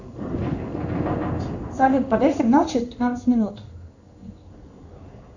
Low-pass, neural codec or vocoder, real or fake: 7.2 kHz; codec, 16 kHz in and 24 kHz out, 1 kbps, XY-Tokenizer; fake